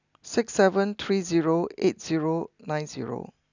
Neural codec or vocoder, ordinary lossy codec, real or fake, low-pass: none; none; real; 7.2 kHz